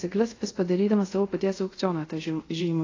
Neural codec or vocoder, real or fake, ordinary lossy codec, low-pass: codec, 24 kHz, 0.5 kbps, DualCodec; fake; AAC, 32 kbps; 7.2 kHz